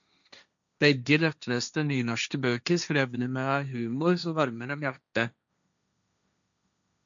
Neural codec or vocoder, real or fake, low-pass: codec, 16 kHz, 1.1 kbps, Voila-Tokenizer; fake; 7.2 kHz